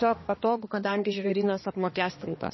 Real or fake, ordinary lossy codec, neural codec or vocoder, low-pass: fake; MP3, 24 kbps; codec, 16 kHz, 2 kbps, X-Codec, HuBERT features, trained on balanced general audio; 7.2 kHz